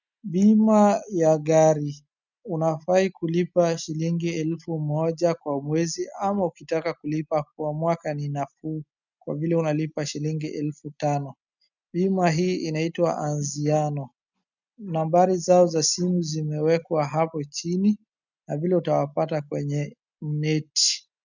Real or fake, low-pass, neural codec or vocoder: real; 7.2 kHz; none